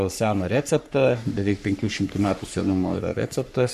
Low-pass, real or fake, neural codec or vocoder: 14.4 kHz; fake; codec, 44.1 kHz, 3.4 kbps, Pupu-Codec